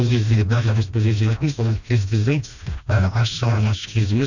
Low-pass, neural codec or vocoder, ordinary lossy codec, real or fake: 7.2 kHz; codec, 16 kHz, 1 kbps, FreqCodec, smaller model; none; fake